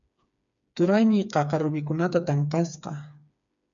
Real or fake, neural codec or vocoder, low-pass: fake; codec, 16 kHz, 4 kbps, FreqCodec, smaller model; 7.2 kHz